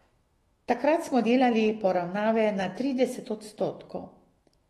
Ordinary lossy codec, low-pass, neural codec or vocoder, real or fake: AAC, 32 kbps; 19.8 kHz; autoencoder, 48 kHz, 128 numbers a frame, DAC-VAE, trained on Japanese speech; fake